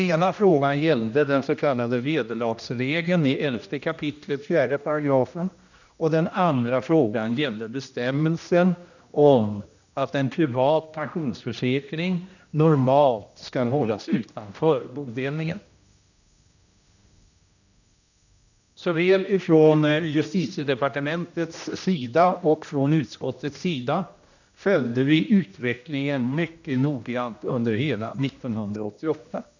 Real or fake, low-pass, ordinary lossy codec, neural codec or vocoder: fake; 7.2 kHz; none; codec, 16 kHz, 1 kbps, X-Codec, HuBERT features, trained on general audio